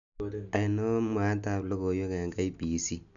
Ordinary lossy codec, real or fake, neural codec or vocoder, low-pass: none; real; none; 7.2 kHz